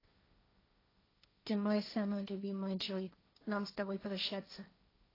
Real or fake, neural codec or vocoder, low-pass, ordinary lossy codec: fake; codec, 16 kHz, 1.1 kbps, Voila-Tokenizer; 5.4 kHz; AAC, 24 kbps